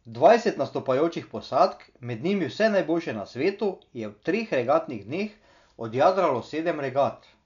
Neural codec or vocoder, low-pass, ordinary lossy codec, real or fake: none; 7.2 kHz; none; real